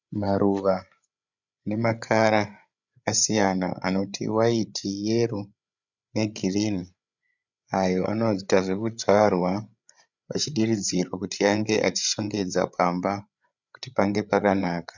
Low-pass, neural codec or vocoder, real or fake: 7.2 kHz; codec, 16 kHz, 8 kbps, FreqCodec, larger model; fake